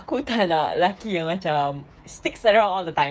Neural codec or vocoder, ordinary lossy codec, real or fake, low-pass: codec, 16 kHz, 8 kbps, FreqCodec, smaller model; none; fake; none